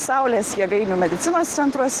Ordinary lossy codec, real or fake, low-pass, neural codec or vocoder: Opus, 16 kbps; real; 14.4 kHz; none